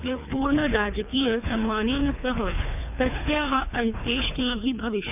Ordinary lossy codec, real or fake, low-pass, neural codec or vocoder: none; fake; 3.6 kHz; codec, 24 kHz, 3 kbps, HILCodec